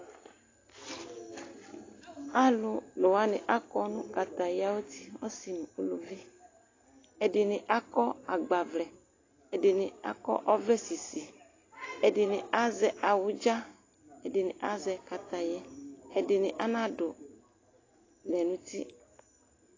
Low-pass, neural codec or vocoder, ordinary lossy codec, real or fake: 7.2 kHz; none; AAC, 32 kbps; real